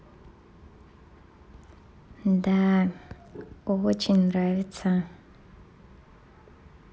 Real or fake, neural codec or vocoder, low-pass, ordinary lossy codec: real; none; none; none